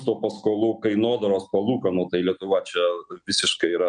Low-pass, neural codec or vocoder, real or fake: 9.9 kHz; none; real